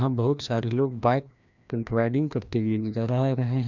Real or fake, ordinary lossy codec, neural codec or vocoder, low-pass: fake; none; codec, 16 kHz, 1 kbps, FreqCodec, larger model; 7.2 kHz